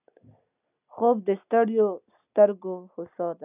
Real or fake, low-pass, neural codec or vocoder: real; 3.6 kHz; none